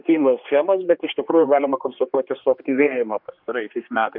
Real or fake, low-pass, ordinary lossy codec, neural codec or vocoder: fake; 5.4 kHz; MP3, 48 kbps; codec, 16 kHz, 2 kbps, X-Codec, HuBERT features, trained on general audio